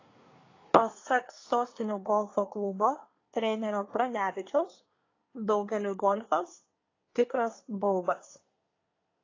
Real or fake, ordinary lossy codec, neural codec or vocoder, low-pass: fake; AAC, 32 kbps; codec, 24 kHz, 1 kbps, SNAC; 7.2 kHz